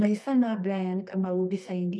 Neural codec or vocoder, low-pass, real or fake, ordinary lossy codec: codec, 24 kHz, 0.9 kbps, WavTokenizer, medium music audio release; none; fake; none